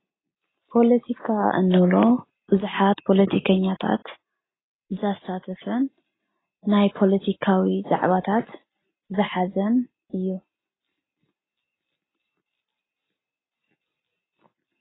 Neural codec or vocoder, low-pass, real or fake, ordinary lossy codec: none; 7.2 kHz; real; AAC, 16 kbps